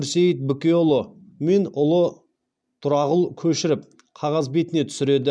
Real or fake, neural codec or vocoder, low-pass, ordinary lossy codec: real; none; 9.9 kHz; none